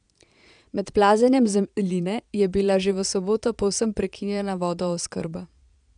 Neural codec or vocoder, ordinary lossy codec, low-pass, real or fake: vocoder, 22.05 kHz, 80 mel bands, Vocos; none; 9.9 kHz; fake